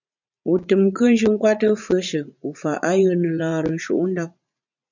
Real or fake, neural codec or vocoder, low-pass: fake; vocoder, 44.1 kHz, 80 mel bands, Vocos; 7.2 kHz